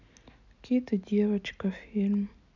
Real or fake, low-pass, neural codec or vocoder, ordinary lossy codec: real; 7.2 kHz; none; none